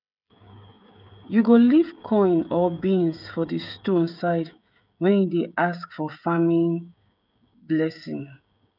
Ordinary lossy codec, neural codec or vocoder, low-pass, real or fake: none; codec, 16 kHz, 16 kbps, FreqCodec, smaller model; 5.4 kHz; fake